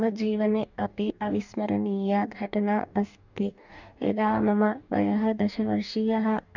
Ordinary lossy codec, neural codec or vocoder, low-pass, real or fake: none; codec, 44.1 kHz, 2.6 kbps, DAC; 7.2 kHz; fake